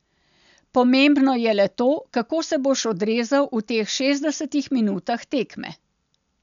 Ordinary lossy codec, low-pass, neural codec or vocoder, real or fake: none; 7.2 kHz; none; real